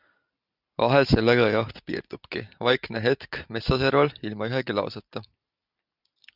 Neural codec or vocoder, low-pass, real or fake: none; 5.4 kHz; real